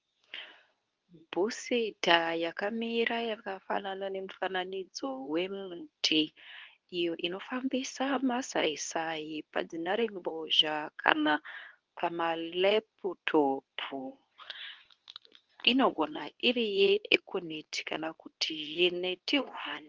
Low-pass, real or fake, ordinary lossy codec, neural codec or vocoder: 7.2 kHz; fake; Opus, 24 kbps; codec, 24 kHz, 0.9 kbps, WavTokenizer, medium speech release version 2